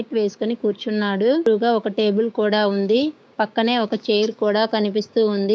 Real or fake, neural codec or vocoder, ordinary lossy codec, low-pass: fake; codec, 16 kHz, 6 kbps, DAC; none; none